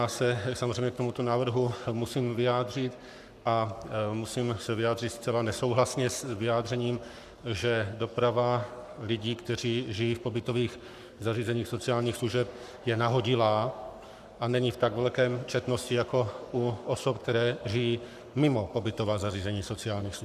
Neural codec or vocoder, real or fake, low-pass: codec, 44.1 kHz, 7.8 kbps, Pupu-Codec; fake; 14.4 kHz